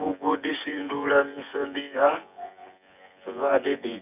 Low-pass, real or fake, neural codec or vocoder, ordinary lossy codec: 3.6 kHz; fake; vocoder, 24 kHz, 100 mel bands, Vocos; none